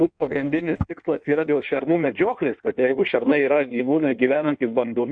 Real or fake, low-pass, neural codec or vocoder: fake; 9.9 kHz; codec, 16 kHz in and 24 kHz out, 1.1 kbps, FireRedTTS-2 codec